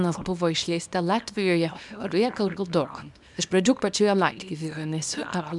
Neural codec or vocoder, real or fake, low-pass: codec, 24 kHz, 0.9 kbps, WavTokenizer, small release; fake; 10.8 kHz